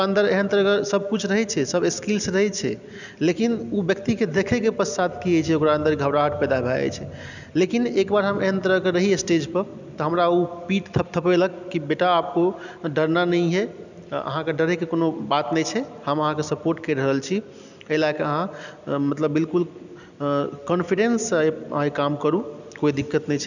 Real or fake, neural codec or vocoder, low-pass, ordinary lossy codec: real; none; 7.2 kHz; none